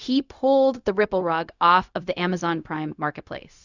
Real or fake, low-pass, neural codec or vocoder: fake; 7.2 kHz; codec, 16 kHz, 0.4 kbps, LongCat-Audio-Codec